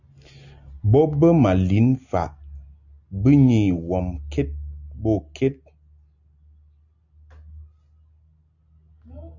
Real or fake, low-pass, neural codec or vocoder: real; 7.2 kHz; none